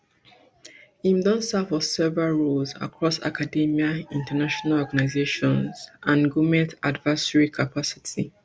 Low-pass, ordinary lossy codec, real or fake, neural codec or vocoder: none; none; real; none